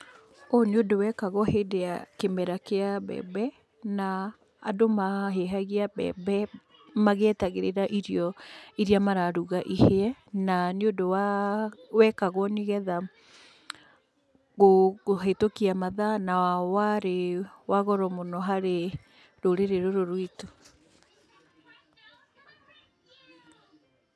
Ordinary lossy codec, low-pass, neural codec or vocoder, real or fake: none; none; none; real